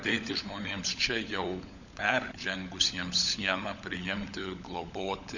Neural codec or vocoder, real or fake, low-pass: codec, 16 kHz, 16 kbps, FunCodec, trained on LibriTTS, 50 frames a second; fake; 7.2 kHz